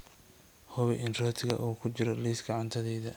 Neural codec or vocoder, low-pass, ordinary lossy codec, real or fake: none; none; none; real